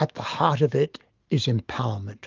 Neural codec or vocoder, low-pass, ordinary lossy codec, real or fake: autoencoder, 48 kHz, 128 numbers a frame, DAC-VAE, trained on Japanese speech; 7.2 kHz; Opus, 32 kbps; fake